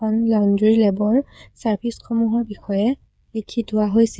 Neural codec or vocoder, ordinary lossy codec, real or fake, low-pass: codec, 16 kHz, 8 kbps, FreqCodec, smaller model; none; fake; none